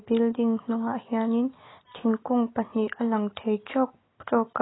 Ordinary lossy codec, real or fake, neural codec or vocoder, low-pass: AAC, 16 kbps; real; none; 7.2 kHz